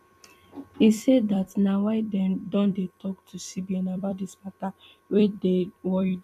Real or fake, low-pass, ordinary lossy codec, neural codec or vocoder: fake; 14.4 kHz; none; vocoder, 44.1 kHz, 128 mel bands every 256 samples, BigVGAN v2